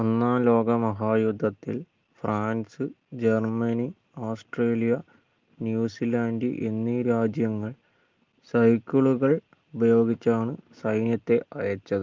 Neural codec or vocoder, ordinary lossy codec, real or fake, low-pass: none; Opus, 32 kbps; real; 7.2 kHz